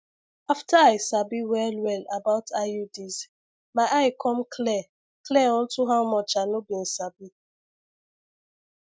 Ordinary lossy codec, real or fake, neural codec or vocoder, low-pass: none; real; none; none